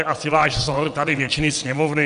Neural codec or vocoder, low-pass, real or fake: vocoder, 22.05 kHz, 80 mel bands, WaveNeXt; 9.9 kHz; fake